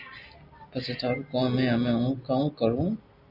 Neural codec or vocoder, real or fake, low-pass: none; real; 5.4 kHz